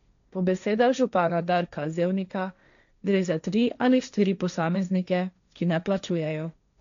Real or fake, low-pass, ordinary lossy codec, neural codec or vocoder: fake; 7.2 kHz; MP3, 96 kbps; codec, 16 kHz, 1.1 kbps, Voila-Tokenizer